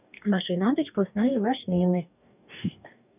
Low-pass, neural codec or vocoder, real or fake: 3.6 kHz; codec, 44.1 kHz, 2.6 kbps, DAC; fake